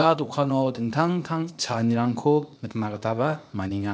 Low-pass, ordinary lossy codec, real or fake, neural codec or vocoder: none; none; fake; codec, 16 kHz, 0.8 kbps, ZipCodec